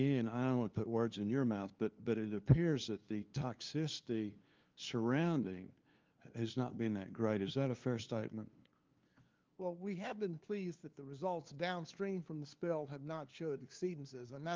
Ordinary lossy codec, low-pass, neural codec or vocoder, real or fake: Opus, 32 kbps; 7.2 kHz; codec, 16 kHz, 2 kbps, FunCodec, trained on LibriTTS, 25 frames a second; fake